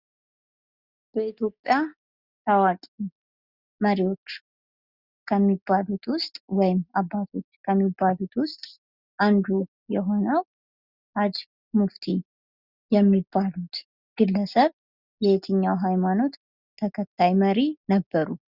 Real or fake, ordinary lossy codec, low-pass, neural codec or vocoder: real; Opus, 64 kbps; 5.4 kHz; none